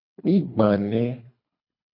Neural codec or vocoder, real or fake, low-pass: codec, 24 kHz, 3 kbps, HILCodec; fake; 5.4 kHz